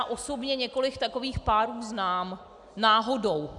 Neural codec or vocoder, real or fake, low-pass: none; real; 10.8 kHz